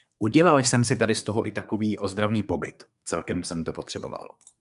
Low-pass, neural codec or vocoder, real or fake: 10.8 kHz; codec, 24 kHz, 1 kbps, SNAC; fake